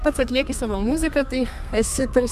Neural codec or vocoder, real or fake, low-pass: codec, 32 kHz, 1.9 kbps, SNAC; fake; 14.4 kHz